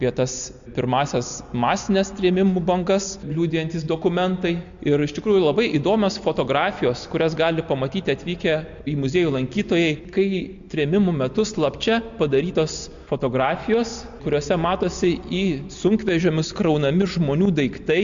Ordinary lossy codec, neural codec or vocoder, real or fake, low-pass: MP3, 64 kbps; none; real; 7.2 kHz